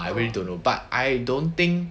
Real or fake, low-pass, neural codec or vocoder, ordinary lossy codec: real; none; none; none